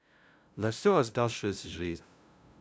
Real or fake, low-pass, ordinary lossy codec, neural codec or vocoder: fake; none; none; codec, 16 kHz, 0.5 kbps, FunCodec, trained on LibriTTS, 25 frames a second